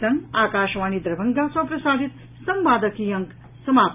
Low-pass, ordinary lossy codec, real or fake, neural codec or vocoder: 3.6 kHz; none; real; none